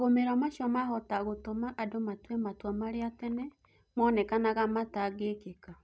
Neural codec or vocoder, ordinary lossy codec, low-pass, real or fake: none; none; none; real